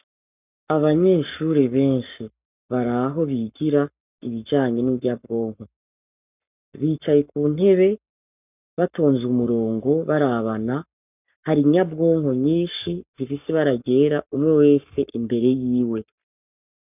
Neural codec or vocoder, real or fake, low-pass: codec, 44.1 kHz, 7.8 kbps, Pupu-Codec; fake; 3.6 kHz